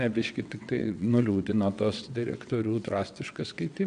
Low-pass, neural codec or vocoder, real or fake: 9.9 kHz; vocoder, 22.05 kHz, 80 mel bands, WaveNeXt; fake